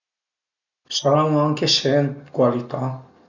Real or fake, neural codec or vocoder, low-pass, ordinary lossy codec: real; none; 7.2 kHz; none